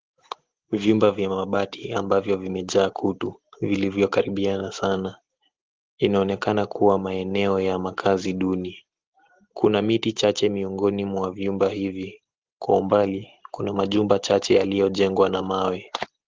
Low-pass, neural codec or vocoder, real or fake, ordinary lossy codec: 7.2 kHz; none; real; Opus, 16 kbps